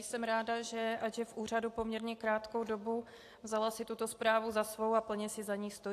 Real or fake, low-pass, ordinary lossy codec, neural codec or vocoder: real; 14.4 kHz; AAC, 64 kbps; none